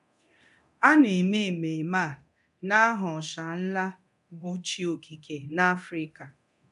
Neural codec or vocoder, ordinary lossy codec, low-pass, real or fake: codec, 24 kHz, 0.9 kbps, DualCodec; none; 10.8 kHz; fake